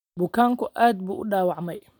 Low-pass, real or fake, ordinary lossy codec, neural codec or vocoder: 19.8 kHz; real; none; none